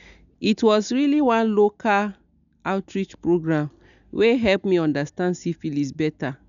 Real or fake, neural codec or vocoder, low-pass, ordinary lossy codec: real; none; 7.2 kHz; none